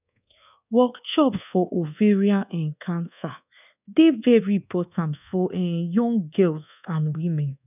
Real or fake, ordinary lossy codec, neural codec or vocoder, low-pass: fake; none; codec, 24 kHz, 1.2 kbps, DualCodec; 3.6 kHz